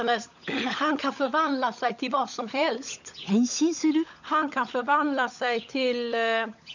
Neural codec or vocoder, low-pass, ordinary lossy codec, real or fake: codec, 16 kHz, 16 kbps, FunCodec, trained on LibriTTS, 50 frames a second; 7.2 kHz; none; fake